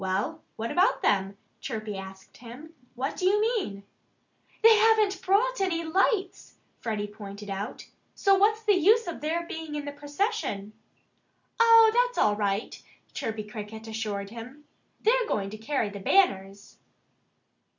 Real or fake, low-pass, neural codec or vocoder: real; 7.2 kHz; none